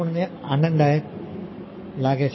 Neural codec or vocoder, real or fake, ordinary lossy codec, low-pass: codec, 44.1 kHz, 7.8 kbps, Pupu-Codec; fake; MP3, 24 kbps; 7.2 kHz